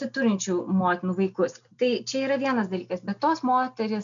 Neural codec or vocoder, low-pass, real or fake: none; 7.2 kHz; real